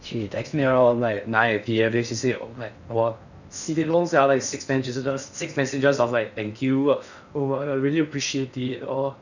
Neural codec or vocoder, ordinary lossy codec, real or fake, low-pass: codec, 16 kHz in and 24 kHz out, 0.6 kbps, FocalCodec, streaming, 4096 codes; none; fake; 7.2 kHz